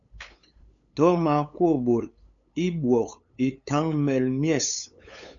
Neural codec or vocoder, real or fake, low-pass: codec, 16 kHz, 8 kbps, FunCodec, trained on LibriTTS, 25 frames a second; fake; 7.2 kHz